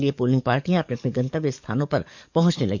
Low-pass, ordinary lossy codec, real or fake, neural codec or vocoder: 7.2 kHz; none; fake; codec, 44.1 kHz, 7.8 kbps, DAC